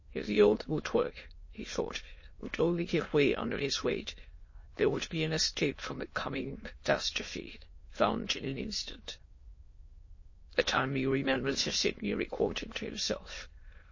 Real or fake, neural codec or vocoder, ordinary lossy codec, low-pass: fake; autoencoder, 22.05 kHz, a latent of 192 numbers a frame, VITS, trained on many speakers; MP3, 32 kbps; 7.2 kHz